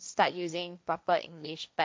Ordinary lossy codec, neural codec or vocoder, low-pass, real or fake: none; codec, 16 kHz, 1.1 kbps, Voila-Tokenizer; none; fake